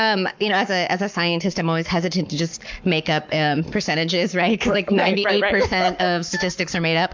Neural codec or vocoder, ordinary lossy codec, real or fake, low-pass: codec, 44.1 kHz, 7.8 kbps, Pupu-Codec; MP3, 64 kbps; fake; 7.2 kHz